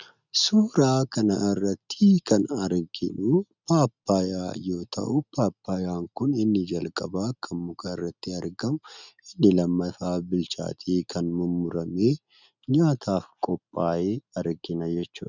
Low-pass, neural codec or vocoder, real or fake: 7.2 kHz; none; real